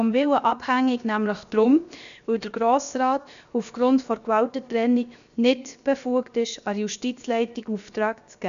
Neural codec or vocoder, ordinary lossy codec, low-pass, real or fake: codec, 16 kHz, 0.7 kbps, FocalCodec; none; 7.2 kHz; fake